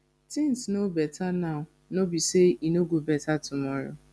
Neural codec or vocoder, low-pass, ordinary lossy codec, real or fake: none; none; none; real